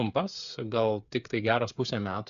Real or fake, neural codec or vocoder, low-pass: fake; codec, 16 kHz, 8 kbps, FreqCodec, smaller model; 7.2 kHz